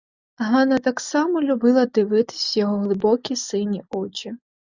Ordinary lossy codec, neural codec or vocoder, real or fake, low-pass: Opus, 64 kbps; none; real; 7.2 kHz